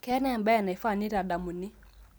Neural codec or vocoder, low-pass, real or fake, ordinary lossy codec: none; none; real; none